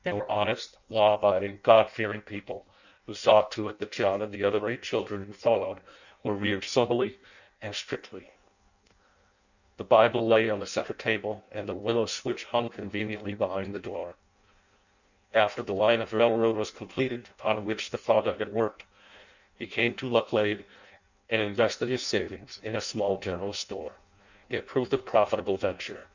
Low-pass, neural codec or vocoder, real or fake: 7.2 kHz; codec, 16 kHz in and 24 kHz out, 0.6 kbps, FireRedTTS-2 codec; fake